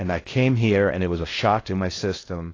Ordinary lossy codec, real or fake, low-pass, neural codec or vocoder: AAC, 32 kbps; fake; 7.2 kHz; codec, 16 kHz in and 24 kHz out, 0.6 kbps, FocalCodec, streaming, 4096 codes